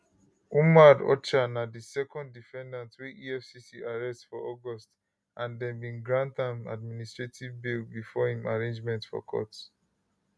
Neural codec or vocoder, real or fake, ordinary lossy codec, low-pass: none; real; none; none